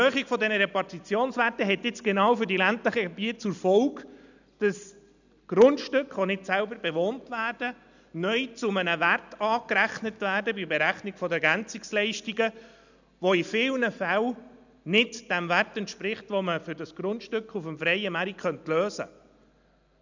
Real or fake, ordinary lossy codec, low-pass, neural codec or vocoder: real; none; 7.2 kHz; none